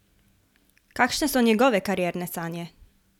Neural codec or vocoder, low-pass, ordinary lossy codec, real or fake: none; 19.8 kHz; none; real